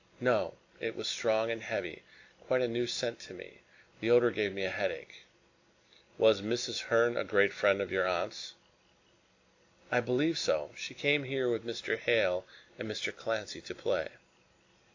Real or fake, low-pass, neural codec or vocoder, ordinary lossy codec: real; 7.2 kHz; none; AAC, 48 kbps